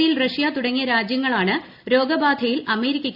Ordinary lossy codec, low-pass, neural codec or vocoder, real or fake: none; 5.4 kHz; none; real